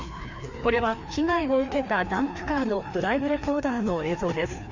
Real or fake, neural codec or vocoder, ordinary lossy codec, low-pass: fake; codec, 16 kHz, 2 kbps, FreqCodec, larger model; Opus, 64 kbps; 7.2 kHz